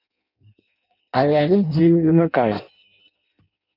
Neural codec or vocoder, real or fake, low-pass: codec, 16 kHz in and 24 kHz out, 0.6 kbps, FireRedTTS-2 codec; fake; 5.4 kHz